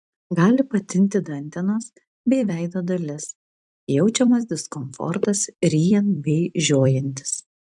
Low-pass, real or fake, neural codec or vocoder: 10.8 kHz; fake; vocoder, 44.1 kHz, 128 mel bands every 256 samples, BigVGAN v2